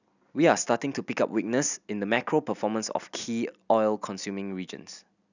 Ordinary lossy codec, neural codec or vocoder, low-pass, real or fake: none; none; 7.2 kHz; real